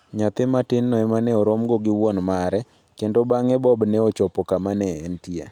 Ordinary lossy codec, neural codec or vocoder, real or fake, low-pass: none; none; real; 19.8 kHz